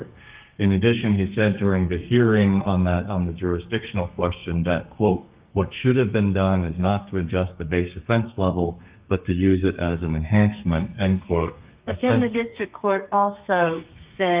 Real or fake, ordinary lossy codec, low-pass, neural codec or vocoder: fake; Opus, 32 kbps; 3.6 kHz; codec, 32 kHz, 1.9 kbps, SNAC